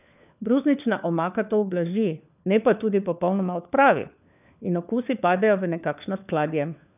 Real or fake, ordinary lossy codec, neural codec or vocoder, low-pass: fake; none; codec, 16 kHz, 4 kbps, FunCodec, trained on LibriTTS, 50 frames a second; 3.6 kHz